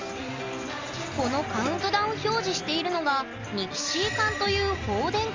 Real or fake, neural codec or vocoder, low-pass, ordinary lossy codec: real; none; 7.2 kHz; Opus, 32 kbps